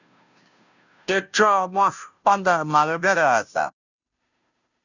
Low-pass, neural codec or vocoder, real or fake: 7.2 kHz; codec, 16 kHz, 0.5 kbps, FunCodec, trained on Chinese and English, 25 frames a second; fake